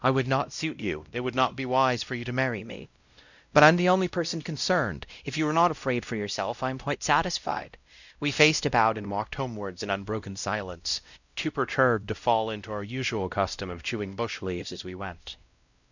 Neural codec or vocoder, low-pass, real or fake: codec, 16 kHz, 0.5 kbps, X-Codec, WavLM features, trained on Multilingual LibriSpeech; 7.2 kHz; fake